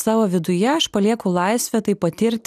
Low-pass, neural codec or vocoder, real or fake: 14.4 kHz; none; real